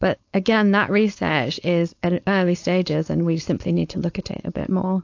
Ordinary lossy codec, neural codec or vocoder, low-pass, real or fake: AAC, 48 kbps; codec, 16 kHz, 4.8 kbps, FACodec; 7.2 kHz; fake